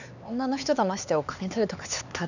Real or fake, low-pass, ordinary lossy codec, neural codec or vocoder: fake; 7.2 kHz; none; codec, 16 kHz, 4 kbps, X-Codec, HuBERT features, trained on LibriSpeech